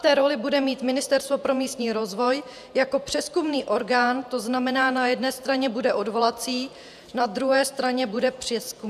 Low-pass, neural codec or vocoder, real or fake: 14.4 kHz; vocoder, 48 kHz, 128 mel bands, Vocos; fake